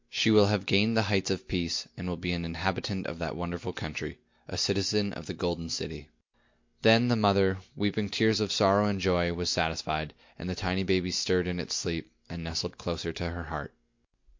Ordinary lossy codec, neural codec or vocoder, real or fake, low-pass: MP3, 48 kbps; none; real; 7.2 kHz